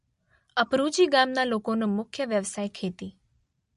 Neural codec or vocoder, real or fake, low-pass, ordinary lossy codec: none; real; 10.8 kHz; MP3, 48 kbps